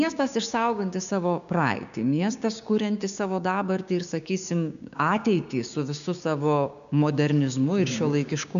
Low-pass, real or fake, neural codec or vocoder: 7.2 kHz; fake; codec, 16 kHz, 6 kbps, DAC